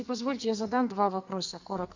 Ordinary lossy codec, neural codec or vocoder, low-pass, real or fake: Opus, 64 kbps; codec, 44.1 kHz, 2.6 kbps, SNAC; 7.2 kHz; fake